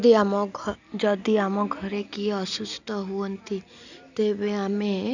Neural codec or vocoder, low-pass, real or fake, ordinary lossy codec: none; 7.2 kHz; real; none